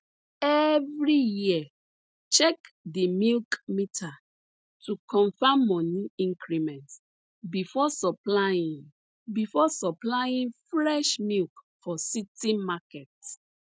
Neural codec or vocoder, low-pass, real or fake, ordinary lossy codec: none; none; real; none